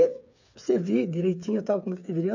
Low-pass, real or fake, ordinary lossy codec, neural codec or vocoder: 7.2 kHz; fake; none; codec, 16 kHz, 8 kbps, FreqCodec, smaller model